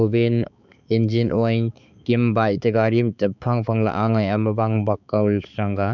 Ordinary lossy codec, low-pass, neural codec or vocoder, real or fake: none; 7.2 kHz; codec, 16 kHz, 4 kbps, X-Codec, HuBERT features, trained on balanced general audio; fake